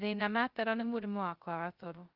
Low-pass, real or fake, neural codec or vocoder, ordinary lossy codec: 5.4 kHz; fake; codec, 16 kHz, 0.2 kbps, FocalCodec; Opus, 32 kbps